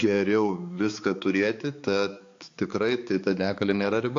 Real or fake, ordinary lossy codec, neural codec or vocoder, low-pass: fake; AAC, 48 kbps; codec, 16 kHz, 4 kbps, X-Codec, HuBERT features, trained on balanced general audio; 7.2 kHz